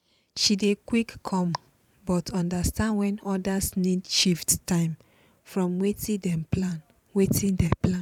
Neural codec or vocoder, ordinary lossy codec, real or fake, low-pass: vocoder, 44.1 kHz, 128 mel bands every 512 samples, BigVGAN v2; none; fake; 19.8 kHz